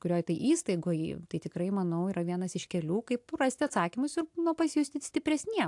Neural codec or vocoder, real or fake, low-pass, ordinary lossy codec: none; real; 10.8 kHz; AAC, 64 kbps